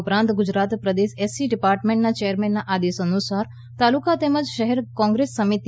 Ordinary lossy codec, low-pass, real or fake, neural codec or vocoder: none; none; real; none